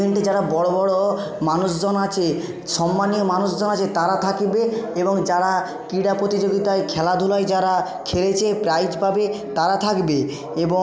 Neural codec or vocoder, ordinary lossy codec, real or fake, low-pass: none; none; real; none